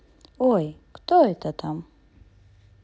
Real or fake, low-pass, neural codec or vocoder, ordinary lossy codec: real; none; none; none